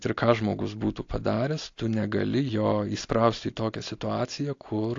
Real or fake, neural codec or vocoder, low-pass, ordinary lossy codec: real; none; 7.2 kHz; AAC, 48 kbps